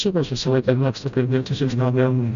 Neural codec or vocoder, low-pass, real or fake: codec, 16 kHz, 0.5 kbps, FreqCodec, smaller model; 7.2 kHz; fake